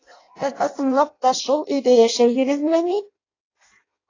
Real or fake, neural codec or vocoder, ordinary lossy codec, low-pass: fake; codec, 16 kHz in and 24 kHz out, 0.6 kbps, FireRedTTS-2 codec; AAC, 32 kbps; 7.2 kHz